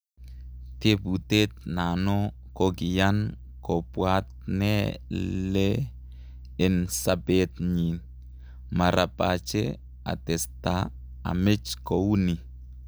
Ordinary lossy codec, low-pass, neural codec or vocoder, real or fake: none; none; none; real